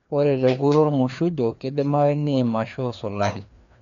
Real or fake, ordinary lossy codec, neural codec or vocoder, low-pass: fake; MP3, 64 kbps; codec, 16 kHz, 2 kbps, FreqCodec, larger model; 7.2 kHz